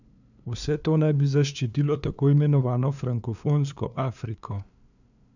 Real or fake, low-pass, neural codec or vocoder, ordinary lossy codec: fake; 7.2 kHz; codec, 16 kHz, 2 kbps, FunCodec, trained on LibriTTS, 25 frames a second; none